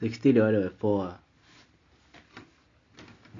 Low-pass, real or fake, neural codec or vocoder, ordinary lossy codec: 7.2 kHz; real; none; MP3, 48 kbps